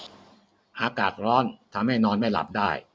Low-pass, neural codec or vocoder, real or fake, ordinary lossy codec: none; none; real; none